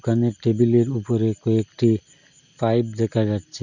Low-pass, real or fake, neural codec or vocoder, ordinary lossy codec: 7.2 kHz; real; none; none